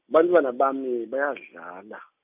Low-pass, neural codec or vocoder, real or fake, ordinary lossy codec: 3.6 kHz; none; real; none